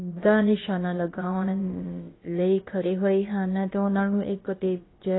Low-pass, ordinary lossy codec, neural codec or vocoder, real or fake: 7.2 kHz; AAC, 16 kbps; codec, 16 kHz, about 1 kbps, DyCAST, with the encoder's durations; fake